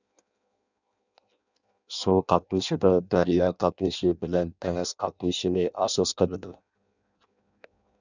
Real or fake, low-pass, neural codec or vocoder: fake; 7.2 kHz; codec, 16 kHz in and 24 kHz out, 0.6 kbps, FireRedTTS-2 codec